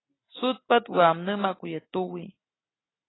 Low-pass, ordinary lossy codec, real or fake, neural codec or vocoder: 7.2 kHz; AAC, 16 kbps; real; none